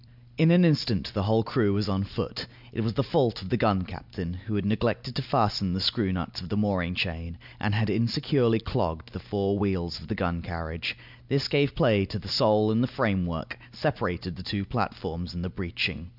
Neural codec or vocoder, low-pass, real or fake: none; 5.4 kHz; real